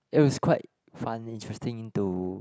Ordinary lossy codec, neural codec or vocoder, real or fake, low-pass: none; none; real; none